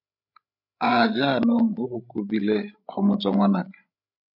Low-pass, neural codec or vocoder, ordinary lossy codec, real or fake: 5.4 kHz; codec, 16 kHz, 8 kbps, FreqCodec, larger model; MP3, 48 kbps; fake